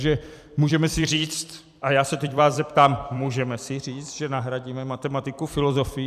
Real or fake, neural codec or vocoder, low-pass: fake; vocoder, 44.1 kHz, 128 mel bands every 256 samples, BigVGAN v2; 14.4 kHz